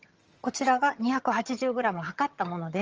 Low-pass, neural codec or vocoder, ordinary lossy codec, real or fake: 7.2 kHz; vocoder, 22.05 kHz, 80 mel bands, HiFi-GAN; Opus, 24 kbps; fake